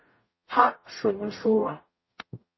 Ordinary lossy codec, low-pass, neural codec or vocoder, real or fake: MP3, 24 kbps; 7.2 kHz; codec, 44.1 kHz, 0.9 kbps, DAC; fake